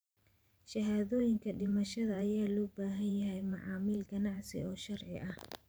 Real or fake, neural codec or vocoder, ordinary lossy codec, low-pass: fake; vocoder, 44.1 kHz, 128 mel bands every 512 samples, BigVGAN v2; none; none